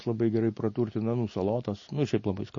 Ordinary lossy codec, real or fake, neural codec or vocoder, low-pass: MP3, 32 kbps; real; none; 7.2 kHz